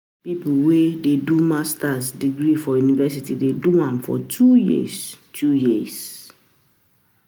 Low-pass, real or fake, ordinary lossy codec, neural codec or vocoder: none; real; none; none